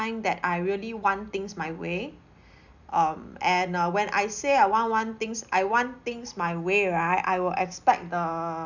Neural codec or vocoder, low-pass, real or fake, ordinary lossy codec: none; 7.2 kHz; real; none